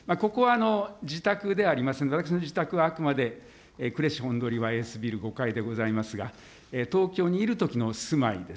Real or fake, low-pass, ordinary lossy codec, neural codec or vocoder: real; none; none; none